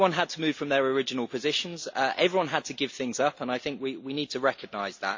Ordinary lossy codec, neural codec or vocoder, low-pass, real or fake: MP3, 64 kbps; none; 7.2 kHz; real